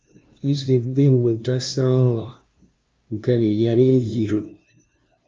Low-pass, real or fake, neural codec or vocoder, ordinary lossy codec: 7.2 kHz; fake; codec, 16 kHz, 0.5 kbps, FunCodec, trained on LibriTTS, 25 frames a second; Opus, 24 kbps